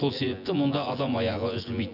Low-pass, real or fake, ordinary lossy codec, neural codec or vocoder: 5.4 kHz; fake; none; vocoder, 24 kHz, 100 mel bands, Vocos